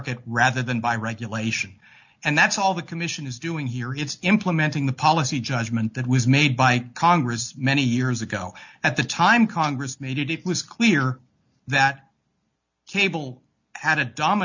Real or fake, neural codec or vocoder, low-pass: real; none; 7.2 kHz